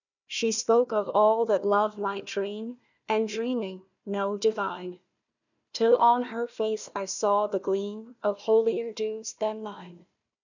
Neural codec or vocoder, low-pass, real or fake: codec, 16 kHz, 1 kbps, FunCodec, trained on Chinese and English, 50 frames a second; 7.2 kHz; fake